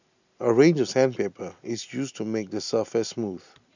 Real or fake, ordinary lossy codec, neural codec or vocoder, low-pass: real; none; none; 7.2 kHz